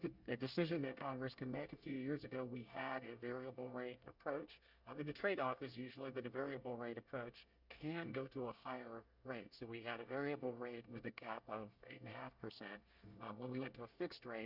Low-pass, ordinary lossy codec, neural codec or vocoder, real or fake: 5.4 kHz; Opus, 64 kbps; codec, 24 kHz, 1 kbps, SNAC; fake